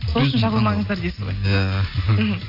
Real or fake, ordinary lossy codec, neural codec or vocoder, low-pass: real; AAC, 32 kbps; none; 5.4 kHz